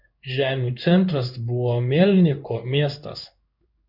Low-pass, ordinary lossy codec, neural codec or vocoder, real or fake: 5.4 kHz; MP3, 48 kbps; codec, 16 kHz in and 24 kHz out, 1 kbps, XY-Tokenizer; fake